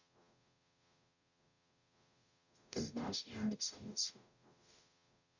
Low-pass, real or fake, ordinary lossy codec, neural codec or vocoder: 7.2 kHz; fake; none; codec, 44.1 kHz, 0.9 kbps, DAC